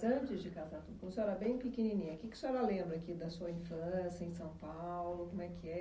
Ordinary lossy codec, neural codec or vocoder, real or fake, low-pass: none; none; real; none